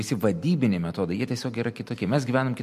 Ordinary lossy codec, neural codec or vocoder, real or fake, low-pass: MP3, 64 kbps; none; real; 14.4 kHz